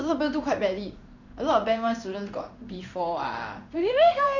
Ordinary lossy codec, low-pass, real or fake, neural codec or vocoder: none; 7.2 kHz; fake; codec, 16 kHz in and 24 kHz out, 1 kbps, XY-Tokenizer